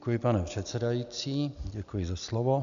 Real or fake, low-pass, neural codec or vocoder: real; 7.2 kHz; none